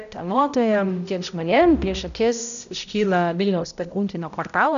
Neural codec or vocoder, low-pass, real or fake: codec, 16 kHz, 0.5 kbps, X-Codec, HuBERT features, trained on balanced general audio; 7.2 kHz; fake